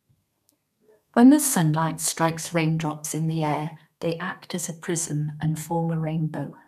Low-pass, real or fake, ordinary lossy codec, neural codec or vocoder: 14.4 kHz; fake; none; codec, 32 kHz, 1.9 kbps, SNAC